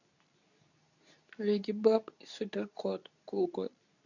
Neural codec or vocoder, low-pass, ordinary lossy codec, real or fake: codec, 24 kHz, 0.9 kbps, WavTokenizer, medium speech release version 2; 7.2 kHz; none; fake